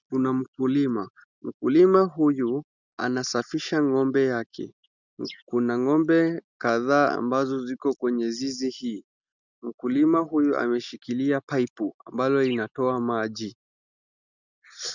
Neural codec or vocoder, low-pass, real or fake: none; 7.2 kHz; real